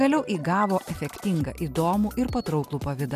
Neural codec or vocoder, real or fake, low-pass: none; real; 14.4 kHz